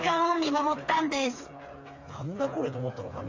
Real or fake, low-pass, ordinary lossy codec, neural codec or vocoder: fake; 7.2 kHz; AAC, 48 kbps; codec, 16 kHz, 4 kbps, FreqCodec, smaller model